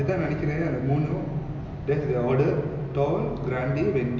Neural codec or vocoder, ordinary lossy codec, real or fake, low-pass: none; none; real; 7.2 kHz